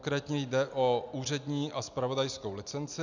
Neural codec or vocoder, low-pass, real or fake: none; 7.2 kHz; real